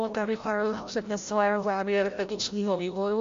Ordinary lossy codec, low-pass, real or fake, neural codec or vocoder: MP3, 48 kbps; 7.2 kHz; fake; codec, 16 kHz, 0.5 kbps, FreqCodec, larger model